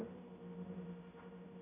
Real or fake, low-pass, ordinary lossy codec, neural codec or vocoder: fake; 3.6 kHz; AAC, 24 kbps; codec, 16 kHz, 0.5 kbps, X-Codec, HuBERT features, trained on general audio